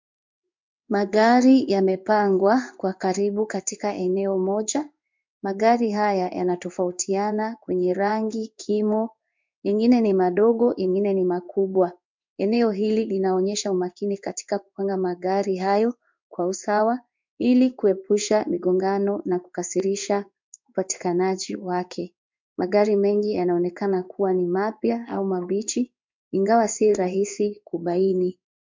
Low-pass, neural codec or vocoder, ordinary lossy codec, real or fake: 7.2 kHz; codec, 16 kHz in and 24 kHz out, 1 kbps, XY-Tokenizer; MP3, 64 kbps; fake